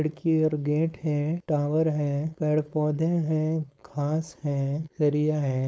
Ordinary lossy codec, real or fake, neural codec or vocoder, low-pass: none; fake; codec, 16 kHz, 4.8 kbps, FACodec; none